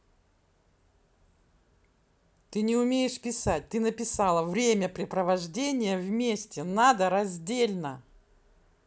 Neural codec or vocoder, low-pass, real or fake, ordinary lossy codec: none; none; real; none